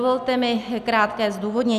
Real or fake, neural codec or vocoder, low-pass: real; none; 14.4 kHz